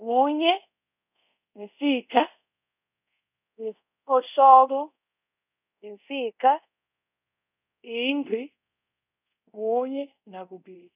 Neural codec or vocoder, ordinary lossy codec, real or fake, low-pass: codec, 24 kHz, 0.5 kbps, DualCodec; none; fake; 3.6 kHz